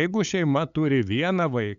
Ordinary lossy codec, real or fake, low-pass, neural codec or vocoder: MP3, 96 kbps; fake; 7.2 kHz; codec, 16 kHz, 8 kbps, FunCodec, trained on LibriTTS, 25 frames a second